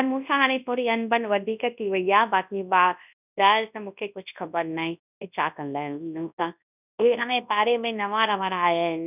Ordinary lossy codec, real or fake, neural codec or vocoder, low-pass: none; fake; codec, 24 kHz, 0.9 kbps, WavTokenizer, large speech release; 3.6 kHz